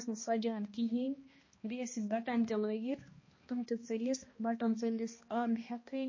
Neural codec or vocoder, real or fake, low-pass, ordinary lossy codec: codec, 16 kHz, 1 kbps, X-Codec, HuBERT features, trained on balanced general audio; fake; 7.2 kHz; MP3, 32 kbps